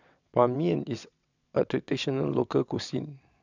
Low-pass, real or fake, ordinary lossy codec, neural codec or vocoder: 7.2 kHz; fake; none; vocoder, 22.05 kHz, 80 mel bands, WaveNeXt